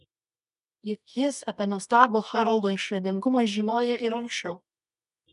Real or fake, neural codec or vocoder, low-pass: fake; codec, 24 kHz, 0.9 kbps, WavTokenizer, medium music audio release; 10.8 kHz